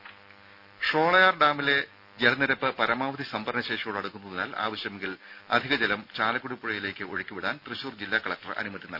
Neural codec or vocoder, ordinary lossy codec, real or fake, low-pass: none; none; real; 5.4 kHz